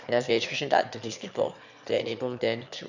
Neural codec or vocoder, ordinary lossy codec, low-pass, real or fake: autoencoder, 22.05 kHz, a latent of 192 numbers a frame, VITS, trained on one speaker; none; 7.2 kHz; fake